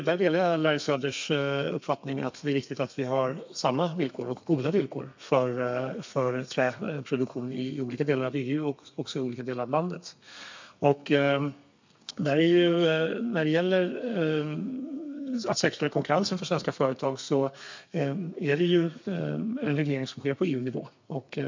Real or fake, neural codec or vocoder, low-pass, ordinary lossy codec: fake; codec, 32 kHz, 1.9 kbps, SNAC; 7.2 kHz; MP3, 64 kbps